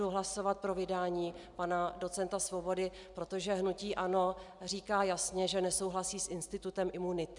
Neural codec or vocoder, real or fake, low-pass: none; real; 10.8 kHz